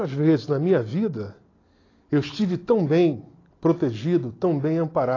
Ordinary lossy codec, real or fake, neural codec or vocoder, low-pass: AAC, 32 kbps; real; none; 7.2 kHz